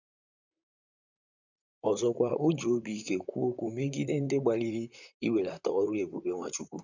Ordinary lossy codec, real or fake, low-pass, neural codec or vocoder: none; fake; 7.2 kHz; vocoder, 44.1 kHz, 128 mel bands, Pupu-Vocoder